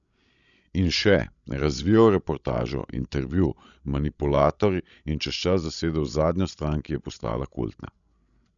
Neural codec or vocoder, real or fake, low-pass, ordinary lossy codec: codec, 16 kHz, 16 kbps, FreqCodec, larger model; fake; 7.2 kHz; none